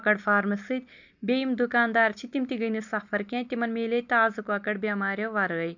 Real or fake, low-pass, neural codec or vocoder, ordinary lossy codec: real; 7.2 kHz; none; none